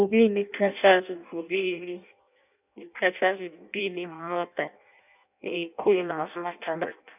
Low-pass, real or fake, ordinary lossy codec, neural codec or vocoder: 3.6 kHz; fake; none; codec, 16 kHz in and 24 kHz out, 0.6 kbps, FireRedTTS-2 codec